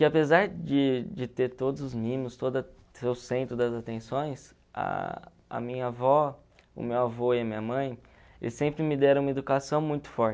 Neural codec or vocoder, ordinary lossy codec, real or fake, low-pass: none; none; real; none